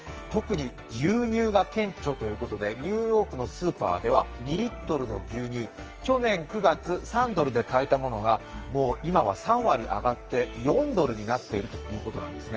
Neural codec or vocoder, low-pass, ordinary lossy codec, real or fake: codec, 44.1 kHz, 2.6 kbps, SNAC; 7.2 kHz; Opus, 24 kbps; fake